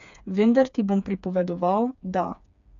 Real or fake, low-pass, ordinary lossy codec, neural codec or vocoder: fake; 7.2 kHz; none; codec, 16 kHz, 4 kbps, FreqCodec, smaller model